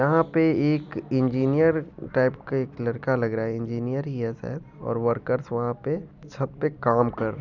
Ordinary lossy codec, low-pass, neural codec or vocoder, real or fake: none; 7.2 kHz; vocoder, 44.1 kHz, 128 mel bands every 256 samples, BigVGAN v2; fake